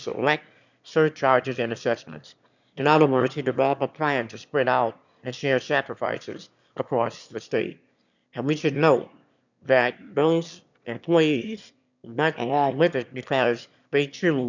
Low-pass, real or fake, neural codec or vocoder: 7.2 kHz; fake; autoencoder, 22.05 kHz, a latent of 192 numbers a frame, VITS, trained on one speaker